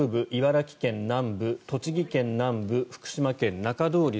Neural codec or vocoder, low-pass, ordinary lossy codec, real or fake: none; none; none; real